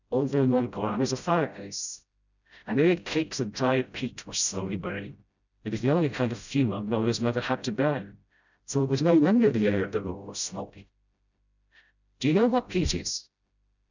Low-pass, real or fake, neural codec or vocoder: 7.2 kHz; fake; codec, 16 kHz, 0.5 kbps, FreqCodec, smaller model